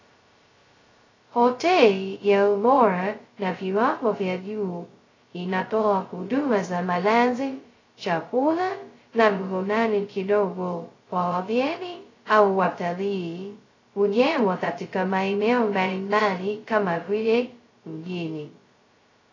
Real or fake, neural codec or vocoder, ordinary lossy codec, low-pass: fake; codec, 16 kHz, 0.2 kbps, FocalCodec; AAC, 32 kbps; 7.2 kHz